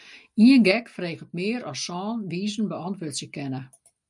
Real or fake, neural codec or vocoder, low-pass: real; none; 10.8 kHz